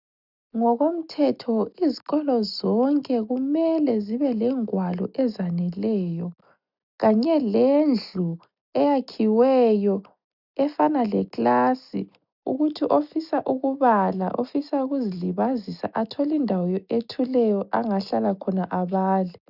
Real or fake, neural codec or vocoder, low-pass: real; none; 5.4 kHz